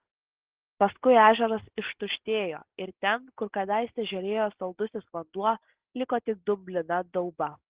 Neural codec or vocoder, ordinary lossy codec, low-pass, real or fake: none; Opus, 16 kbps; 3.6 kHz; real